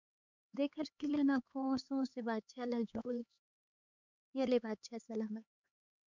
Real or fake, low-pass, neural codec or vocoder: fake; 7.2 kHz; codec, 16 kHz, 4 kbps, X-Codec, HuBERT features, trained on LibriSpeech